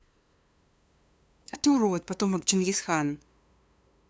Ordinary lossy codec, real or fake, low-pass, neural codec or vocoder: none; fake; none; codec, 16 kHz, 2 kbps, FunCodec, trained on LibriTTS, 25 frames a second